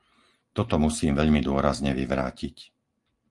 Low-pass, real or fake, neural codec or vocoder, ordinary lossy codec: 10.8 kHz; real; none; Opus, 32 kbps